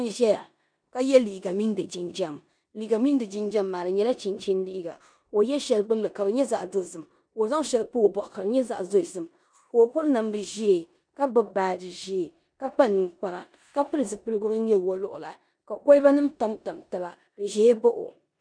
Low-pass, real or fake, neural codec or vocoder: 9.9 kHz; fake; codec, 16 kHz in and 24 kHz out, 0.9 kbps, LongCat-Audio-Codec, four codebook decoder